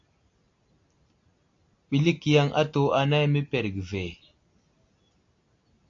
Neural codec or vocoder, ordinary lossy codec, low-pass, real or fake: none; AAC, 48 kbps; 7.2 kHz; real